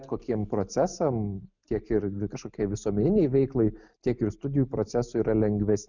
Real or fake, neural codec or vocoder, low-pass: real; none; 7.2 kHz